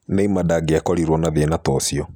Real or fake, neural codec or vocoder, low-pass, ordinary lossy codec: real; none; none; none